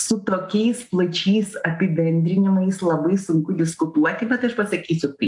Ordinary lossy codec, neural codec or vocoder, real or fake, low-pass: AAC, 64 kbps; none; real; 10.8 kHz